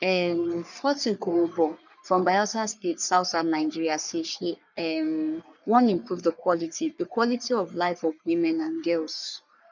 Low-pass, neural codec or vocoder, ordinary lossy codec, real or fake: 7.2 kHz; codec, 44.1 kHz, 3.4 kbps, Pupu-Codec; none; fake